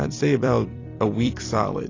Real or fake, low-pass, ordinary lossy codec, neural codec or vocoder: fake; 7.2 kHz; AAC, 32 kbps; vocoder, 44.1 kHz, 128 mel bands every 256 samples, BigVGAN v2